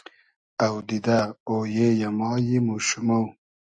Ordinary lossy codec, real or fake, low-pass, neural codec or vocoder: AAC, 64 kbps; real; 9.9 kHz; none